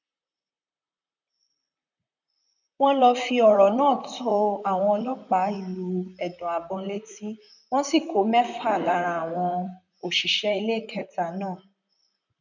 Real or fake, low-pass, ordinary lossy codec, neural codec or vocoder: fake; 7.2 kHz; none; vocoder, 44.1 kHz, 128 mel bands, Pupu-Vocoder